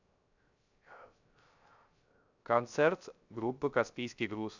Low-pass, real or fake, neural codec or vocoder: 7.2 kHz; fake; codec, 16 kHz, 0.3 kbps, FocalCodec